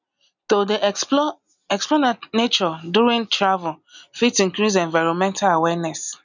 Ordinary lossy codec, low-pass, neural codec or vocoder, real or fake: none; 7.2 kHz; none; real